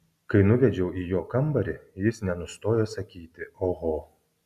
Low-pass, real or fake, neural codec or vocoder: 14.4 kHz; real; none